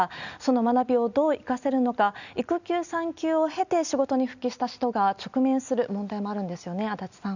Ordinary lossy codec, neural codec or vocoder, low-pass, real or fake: none; none; 7.2 kHz; real